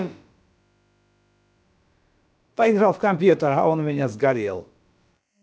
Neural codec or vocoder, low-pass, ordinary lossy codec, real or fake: codec, 16 kHz, about 1 kbps, DyCAST, with the encoder's durations; none; none; fake